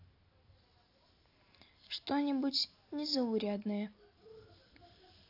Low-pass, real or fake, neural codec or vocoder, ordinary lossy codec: 5.4 kHz; real; none; AAC, 32 kbps